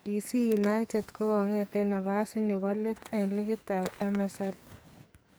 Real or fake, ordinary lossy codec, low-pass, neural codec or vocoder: fake; none; none; codec, 44.1 kHz, 2.6 kbps, SNAC